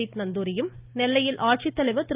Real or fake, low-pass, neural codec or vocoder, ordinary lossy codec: real; 3.6 kHz; none; Opus, 32 kbps